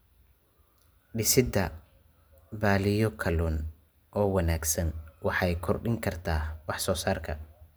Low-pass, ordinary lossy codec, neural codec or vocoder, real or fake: none; none; none; real